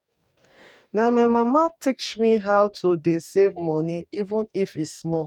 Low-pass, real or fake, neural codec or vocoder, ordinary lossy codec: 19.8 kHz; fake; codec, 44.1 kHz, 2.6 kbps, DAC; none